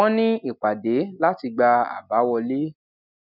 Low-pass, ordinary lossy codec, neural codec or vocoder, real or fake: 5.4 kHz; none; none; real